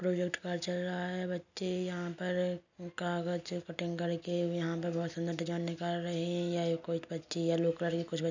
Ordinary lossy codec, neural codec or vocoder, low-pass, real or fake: none; none; 7.2 kHz; real